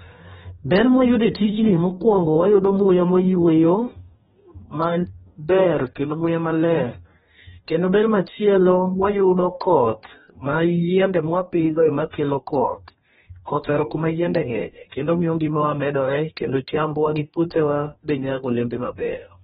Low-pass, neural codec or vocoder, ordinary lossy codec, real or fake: 19.8 kHz; codec, 44.1 kHz, 2.6 kbps, DAC; AAC, 16 kbps; fake